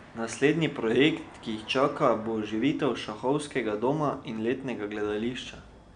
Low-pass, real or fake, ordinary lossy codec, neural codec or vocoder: 9.9 kHz; real; none; none